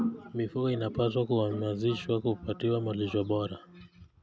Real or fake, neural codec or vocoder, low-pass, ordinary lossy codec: real; none; none; none